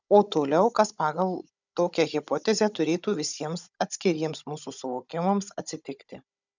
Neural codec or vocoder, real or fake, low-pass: codec, 16 kHz, 16 kbps, FunCodec, trained on Chinese and English, 50 frames a second; fake; 7.2 kHz